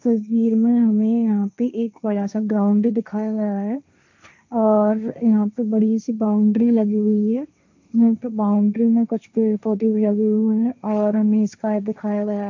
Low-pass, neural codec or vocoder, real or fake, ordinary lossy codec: 7.2 kHz; codec, 16 kHz, 1.1 kbps, Voila-Tokenizer; fake; MP3, 64 kbps